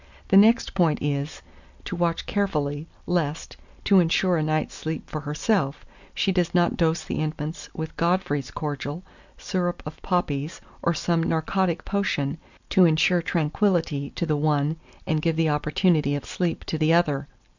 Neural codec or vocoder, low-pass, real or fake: none; 7.2 kHz; real